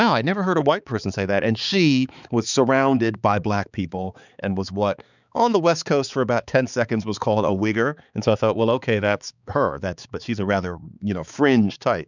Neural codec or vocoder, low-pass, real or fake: codec, 16 kHz, 4 kbps, X-Codec, HuBERT features, trained on balanced general audio; 7.2 kHz; fake